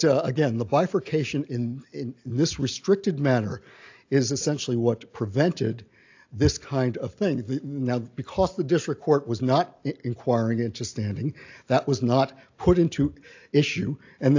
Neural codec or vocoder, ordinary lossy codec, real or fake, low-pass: none; AAC, 48 kbps; real; 7.2 kHz